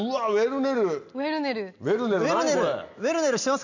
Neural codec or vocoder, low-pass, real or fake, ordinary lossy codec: none; 7.2 kHz; real; none